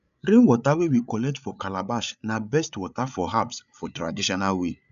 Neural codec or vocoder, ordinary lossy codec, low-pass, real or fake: codec, 16 kHz, 8 kbps, FreqCodec, larger model; none; 7.2 kHz; fake